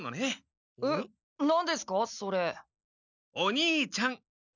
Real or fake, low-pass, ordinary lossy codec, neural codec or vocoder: real; 7.2 kHz; none; none